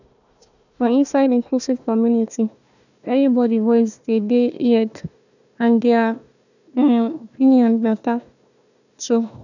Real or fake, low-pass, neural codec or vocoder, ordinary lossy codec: fake; 7.2 kHz; codec, 16 kHz, 1 kbps, FunCodec, trained on Chinese and English, 50 frames a second; none